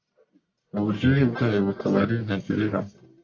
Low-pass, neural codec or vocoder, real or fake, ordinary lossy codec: 7.2 kHz; codec, 44.1 kHz, 1.7 kbps, Pupu-Codec; fake; MP3, 48 kbps